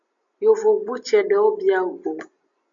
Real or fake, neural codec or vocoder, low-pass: real; none; 7.2 kHz